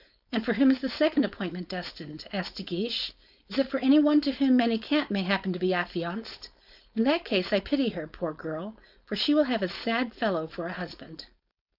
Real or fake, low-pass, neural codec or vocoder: fake; 5.4 kHz; codec, 16 kHz, 4.8 kbps, FACodec